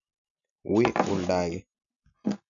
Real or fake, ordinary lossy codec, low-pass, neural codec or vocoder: real; none; 7.2 kHz; none